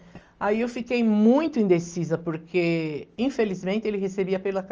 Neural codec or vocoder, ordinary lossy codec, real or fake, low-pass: none; Opus, 24 kbps; real; 7.2 kHz